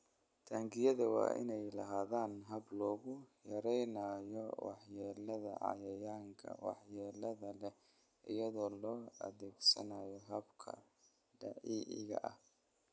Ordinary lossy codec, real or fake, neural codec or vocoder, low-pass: none; real; none; none